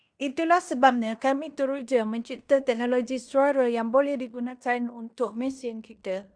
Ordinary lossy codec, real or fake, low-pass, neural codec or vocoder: Opus, 64 kbps; fake; 9.9 kHz; codec, 16 kHz in and 24 kHz out, 0.9 kbps, LongCat-Audio-Codec, fine tuned four codebook decoder